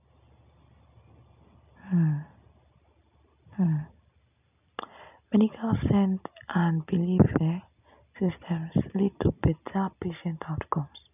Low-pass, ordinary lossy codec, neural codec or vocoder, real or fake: 3.6 kHz; none; none; real